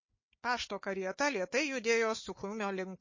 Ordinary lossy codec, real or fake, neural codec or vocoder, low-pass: MP3, 32 kbps; fake; codec, 16 kHz, 4 kbps, FunCodec, trained on Chinese and English, 50 frames a second; 7.2 kHz